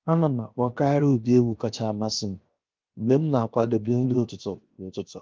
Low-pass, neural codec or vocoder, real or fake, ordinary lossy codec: 7.2 kHz; codec, 16 kHz, 0.7 kbps, FocalCodec; fake; Opus, 32 kbps